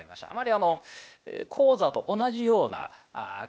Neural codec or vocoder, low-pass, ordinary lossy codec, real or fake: codec, 16 kHz, 0.8 kbps, ZipCodec; none; none; fake